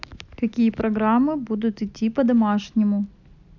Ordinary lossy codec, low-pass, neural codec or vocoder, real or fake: none; 7.2 kHz; none; real